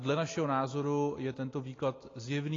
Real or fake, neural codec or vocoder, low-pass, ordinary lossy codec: real; none; 7.2 kHz; AAC, 32 kbps